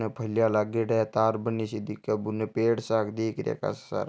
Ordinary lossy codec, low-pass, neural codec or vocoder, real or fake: none; none; none; real